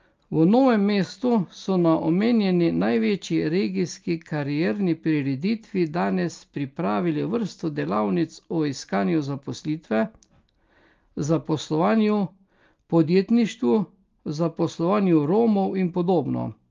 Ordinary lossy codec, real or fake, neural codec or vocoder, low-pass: Opus, 32 kbps; real; none; 7.2 kHz